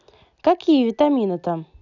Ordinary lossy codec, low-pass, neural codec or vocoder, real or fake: none; 7.2 kHz; none; real